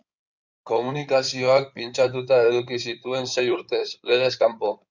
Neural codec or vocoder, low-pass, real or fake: codec, 16 kHz in and 24 kHz out, 2.2 kbps, FireRedTTS-2 codec; 7.2 kHz; fake